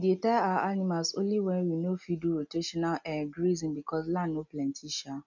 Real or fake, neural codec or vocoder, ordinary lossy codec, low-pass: real; none; none; 7.2 kHz